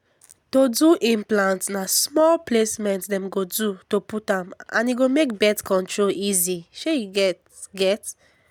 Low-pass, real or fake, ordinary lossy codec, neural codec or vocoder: none; real; none; none